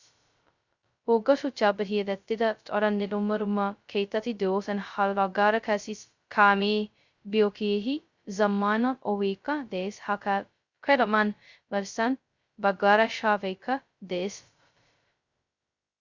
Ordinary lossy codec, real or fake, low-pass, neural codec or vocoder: Opus, 64 kbps; fake; 7.2 kHz; codec, 16 kHz, 0.2 kbps, FocalCodec